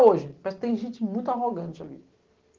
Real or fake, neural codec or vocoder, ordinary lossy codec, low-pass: real; none; Opus, 16 kbps; 7.2 kHz